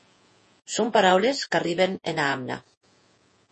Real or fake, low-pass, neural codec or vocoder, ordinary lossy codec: fake; 10.8 kHz; vocoder, 48 kHz, 128 mel bands, Vocos; MP3, 32 kbps